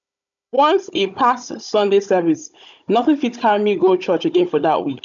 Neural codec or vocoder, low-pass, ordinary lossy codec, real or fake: codec, 16 kHz, 16 kbps, FunCodec, trained on Chinese and English, 50 frames a second; 7.2 kHz; none; fake